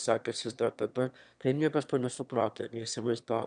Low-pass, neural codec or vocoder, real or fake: 9.9 kHz; autoencoder, 22.05 kHz, a latent of 192 numbers a frame, VITS, trained on one speaker; fake